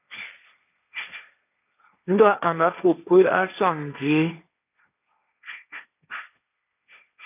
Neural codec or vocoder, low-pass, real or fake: codec, 16 kHz, 1.1 kbps, Voila-Tokenizer; 3.6 kHz; fake